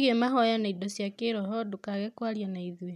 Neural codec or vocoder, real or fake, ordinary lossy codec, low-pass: none; real; none; 14.4 kHz